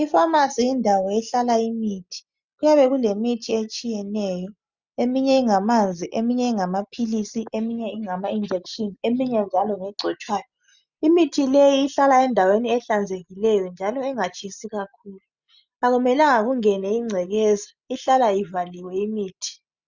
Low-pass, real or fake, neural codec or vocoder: 7.2 kHz; real; none